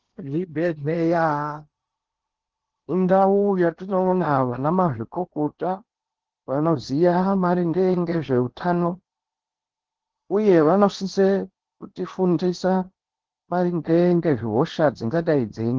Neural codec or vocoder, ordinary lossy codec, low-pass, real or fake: codec, 16 kHz in and 24 kHz out, 0.8 kbps, FocalCodec, streaming, 65536 codes; Opus, 16 kbps; 7.2 kHz; fake